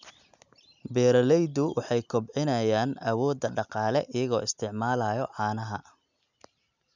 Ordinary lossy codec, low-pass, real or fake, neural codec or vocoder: none; 7.2 kHz; real; none